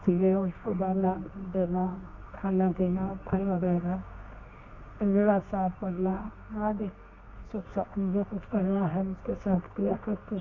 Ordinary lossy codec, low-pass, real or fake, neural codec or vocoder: none; 7.2 kHz; fake; codec, 24 kHz, 0.9 kbps, WavTokenizer, medium music audio release